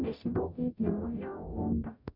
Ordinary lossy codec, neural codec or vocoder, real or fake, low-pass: MP3, 48 kbps; codec, 44.1 kHz, 0.9 kbps, DAC; fake; 5.4 kHz